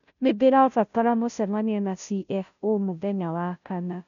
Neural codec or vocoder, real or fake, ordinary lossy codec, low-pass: codec, 16 kHz, 0.5 kbps, FunCodec, trained on Chinese and English, 25 frames a second; fake; none; 7.2 kHz